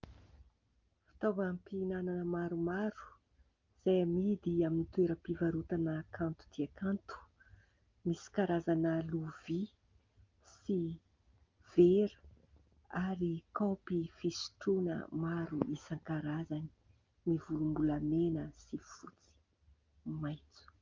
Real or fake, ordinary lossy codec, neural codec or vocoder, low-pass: real; Opus, 24 kbps; none; 7.2 kHz